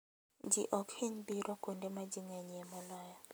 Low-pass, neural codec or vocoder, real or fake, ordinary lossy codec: none; none; real; none